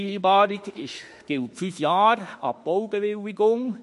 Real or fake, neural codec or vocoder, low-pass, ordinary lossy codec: fake; autoencoder, 48 kHz, 32 numbers a frame, DAC-VAE, trained on Japanese speech; 14.4 kHz; MP3, 48 kbps